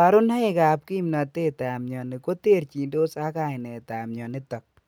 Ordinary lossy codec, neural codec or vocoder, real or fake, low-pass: none; none; real; none